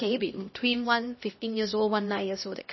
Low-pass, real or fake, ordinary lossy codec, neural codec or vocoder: 7.2 kHz; fake; MP3, 24 kbps; codec, 16 kHz, 1 kbps, X-Codec, HuBERT features, trained on LibriSpeech